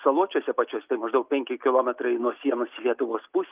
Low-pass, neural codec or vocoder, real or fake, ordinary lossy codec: 3.6 kHz; none; real; Opus, 24 kbps